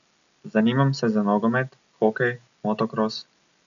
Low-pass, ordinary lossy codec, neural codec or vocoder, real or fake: 7.2 kHz; none; none; real